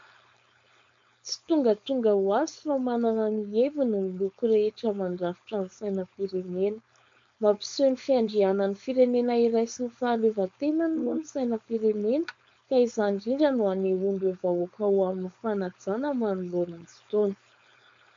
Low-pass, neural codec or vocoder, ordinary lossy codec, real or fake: 7.2 kHz; codec, 16 kHz, 4.8 kbps, FACodec; MP3, 48 kbps; fake